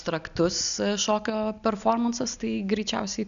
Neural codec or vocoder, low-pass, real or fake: none; 7.2 kHz; real